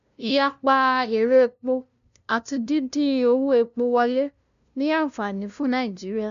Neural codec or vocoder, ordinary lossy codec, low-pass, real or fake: codec, 16 kHz, 0.5 kbps, FunCodec, trained on LibriTTS, 25 frames a second; none; 7.2 kHz; fake